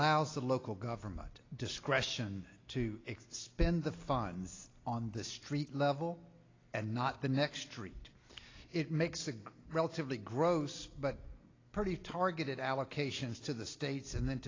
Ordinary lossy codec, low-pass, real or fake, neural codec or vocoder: AAC, 32 kbps; 7.2 kHz; real; none